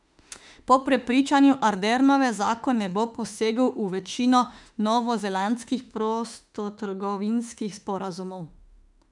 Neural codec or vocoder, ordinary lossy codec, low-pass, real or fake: autoencoder, 48 kHz, 32 numbers a frame, DAC-VAE, trained on Japanese speech; none; 10.8 kHz; fake